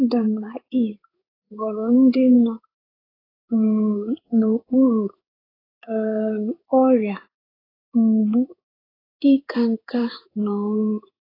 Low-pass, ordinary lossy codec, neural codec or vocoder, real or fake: 5.4 kHz; AAC, 24 kbps; codec, 16 kHz in and 24 kHz out, 1 kbps, XY-Tokenizer; fake